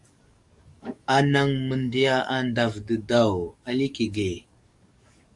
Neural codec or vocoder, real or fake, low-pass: codec, 44.1 kHz, 7.8 kbps, DAC; fake; 10.8 kHz